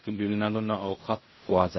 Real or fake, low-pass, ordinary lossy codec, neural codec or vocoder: fake; 7.2 kHz; MP3, 24 kbps; codec, 16 kHz in and 24 kHz out, 0.4 kbps, LongCat-Audio-Codec, fine tuned four codebook decoder